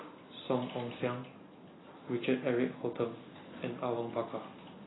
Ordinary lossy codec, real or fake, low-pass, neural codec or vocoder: AAC, 16 kbps; real; 7.2 kHz; none